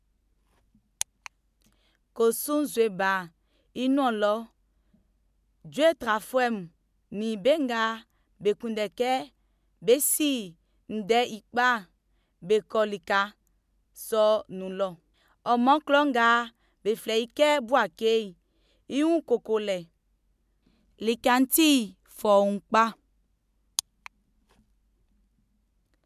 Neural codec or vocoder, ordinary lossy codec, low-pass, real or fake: none; MP3, 96 kbps; 14.4 kHz; real